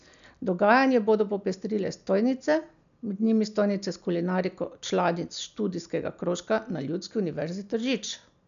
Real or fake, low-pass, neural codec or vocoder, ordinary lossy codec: real; 7.2 kHz; none; none